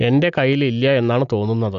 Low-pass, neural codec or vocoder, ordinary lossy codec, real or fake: 7.2 kHz; none; Opus, 64 kbps; real